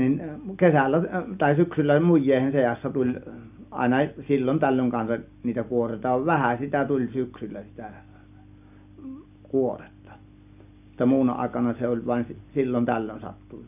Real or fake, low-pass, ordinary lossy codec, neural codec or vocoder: real; 3.6 kHz; none; none